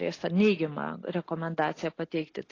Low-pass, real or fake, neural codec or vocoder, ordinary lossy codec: 7.2 kHz; real; none; AAC, 32 kbps